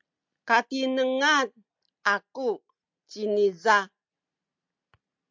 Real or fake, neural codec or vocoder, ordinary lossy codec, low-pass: real; none; MP3, 64 kbps; 7.2 kHz